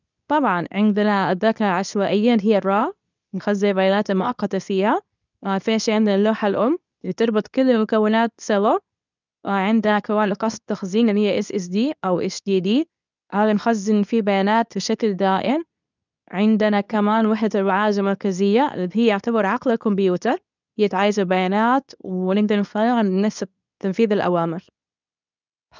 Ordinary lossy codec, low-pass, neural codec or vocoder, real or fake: none; 7.2 kHz; codec, 24 kHz, 0.9 kbps, WavTokenizer, medium speech release version 2; fake